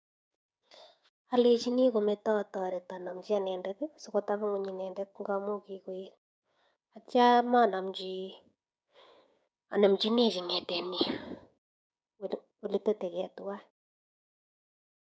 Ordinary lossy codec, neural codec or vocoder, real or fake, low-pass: none; codec, 16 kHz, 6 kbps, DAC; fake; none